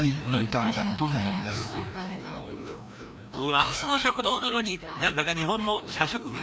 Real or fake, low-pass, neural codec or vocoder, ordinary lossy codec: fake; none; codec, 16 kHz, 1 kbps, FreqCodec, larger model; none